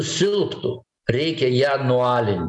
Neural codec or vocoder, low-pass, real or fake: none; 10.8 kHz; real